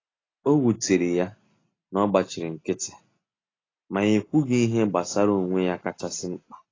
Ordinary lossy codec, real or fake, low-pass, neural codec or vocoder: AAC, 32 kbps; real; 7.2 kHz; none